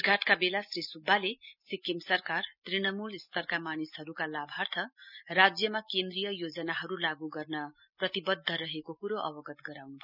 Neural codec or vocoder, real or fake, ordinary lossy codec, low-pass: none; real; none; 5.4 kHz